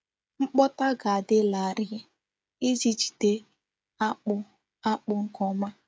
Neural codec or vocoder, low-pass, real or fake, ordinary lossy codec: codec, 16 kHz, 16 kbps, FreqCodec, smaller model; none; fake; none